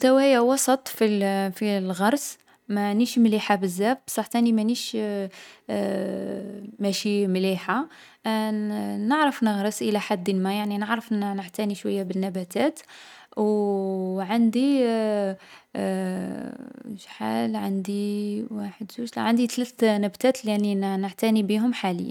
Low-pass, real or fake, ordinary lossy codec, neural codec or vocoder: 19.8 kHz; real; none; none